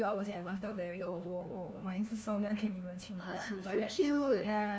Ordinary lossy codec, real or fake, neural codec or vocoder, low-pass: none; fake; codec, 16 kHz, 1 kbps, FunCodec, trained on LibriTTS, 50 frames a second; none